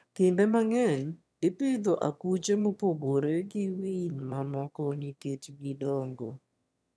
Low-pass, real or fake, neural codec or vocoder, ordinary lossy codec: none; fake; autoencoder, 22.05 kHz, a latent of 192 numbers a frame, VITS, trained on one speaker; none